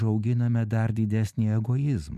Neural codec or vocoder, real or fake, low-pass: none; real; 14.4 kHz